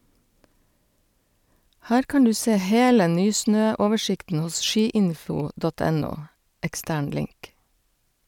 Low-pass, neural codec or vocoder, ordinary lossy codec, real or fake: 19.8 kHz; none; none; real